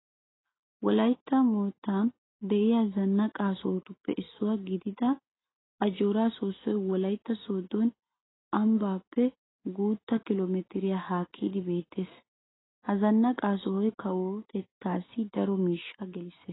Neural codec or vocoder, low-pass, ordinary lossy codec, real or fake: none; 7.2 kHz; AAC, 16 kbps; real